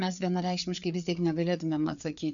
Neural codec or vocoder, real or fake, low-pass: codec, 16 kHz, 4 kbps, FreqCodec, larger model; fake; 7.2 kHz